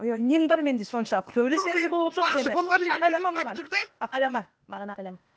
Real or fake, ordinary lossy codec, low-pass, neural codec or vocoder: fake; none; none; codec, 16 kHz, 0.8 kbps, ZipCodec